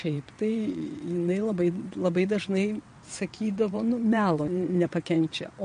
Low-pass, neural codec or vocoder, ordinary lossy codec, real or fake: 9.9 kHz; vocoder, 22.05 kHz, 80 mel bands, WaveNeXt; MP3, 48 kbps; fake